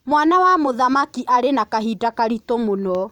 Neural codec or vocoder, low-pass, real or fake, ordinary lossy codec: vocoder, 44.1 kHz, 128 mel bands every 512 samples, BigVGAN v2; 19.8 kHz; fake; none